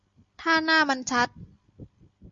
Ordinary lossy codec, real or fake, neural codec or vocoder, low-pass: Opus, 64 kbps; real; none; 7.2 kHz